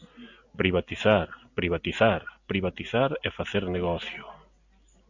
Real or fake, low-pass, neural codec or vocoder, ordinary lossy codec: real; 7.2 kHz; none; Opus, 64 kbps